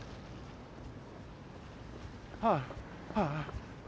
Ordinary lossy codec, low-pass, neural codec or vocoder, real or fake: none; none; none; real